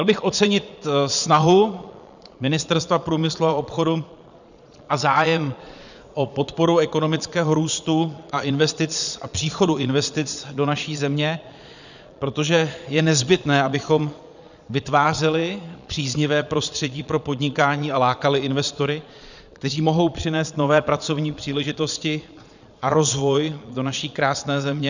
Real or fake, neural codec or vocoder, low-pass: fake; vocoder, 22.05 kHz, 80 mel bands, Vocos; 7.2 kHz